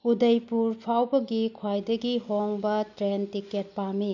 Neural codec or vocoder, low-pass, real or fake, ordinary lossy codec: none; 7.2 kHz; real; none